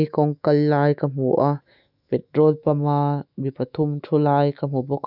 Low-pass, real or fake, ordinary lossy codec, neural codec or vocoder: 5.4 kHz; fake; none; autoencoder, 48 kHz, 128 numbers a frame, DAC-VAE, trained on Japanese speech